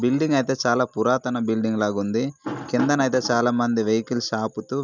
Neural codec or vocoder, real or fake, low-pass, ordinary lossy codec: none; real; 7.2 kHz; none